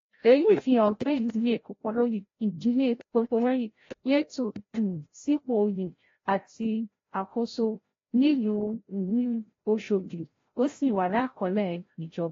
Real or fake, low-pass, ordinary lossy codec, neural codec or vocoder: fake; 7.2 kHz; AAC, 32 kbps; codec, 16 kHz, 0.5 kbps, FreqCodec, larger model